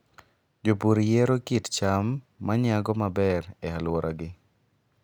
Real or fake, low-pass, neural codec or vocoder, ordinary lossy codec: real; none; none; none